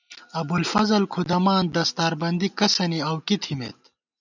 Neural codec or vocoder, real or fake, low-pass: none; real; 7.2 kHz